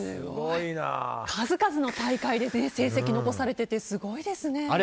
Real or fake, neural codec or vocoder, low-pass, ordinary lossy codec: real; none; none; none